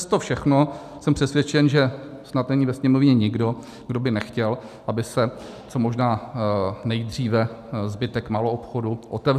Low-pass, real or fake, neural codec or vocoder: 14.4 kHz; real; none